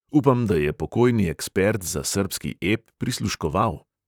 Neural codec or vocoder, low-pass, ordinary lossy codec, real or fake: vocoder, 44.1 kHz, 128 mel bands, Pupu-Vocoder; none; none; fake